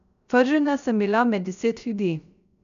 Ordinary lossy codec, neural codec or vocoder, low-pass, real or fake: none; codec, 16 kHz, 0.3 kbps, FocalCodec; 7.2 kHz; fake